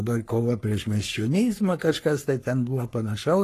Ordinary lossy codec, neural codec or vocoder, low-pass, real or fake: AAC, 48 kbps; codec, 44.1 kHz, 3.4 kbps, Pupu-Codec; 14.4 kHz; fake